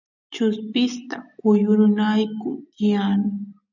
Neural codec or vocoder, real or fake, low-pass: none; real; 7.2 kHz